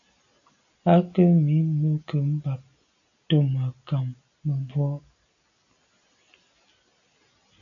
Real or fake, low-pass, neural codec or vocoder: real; 7.2 kHz; none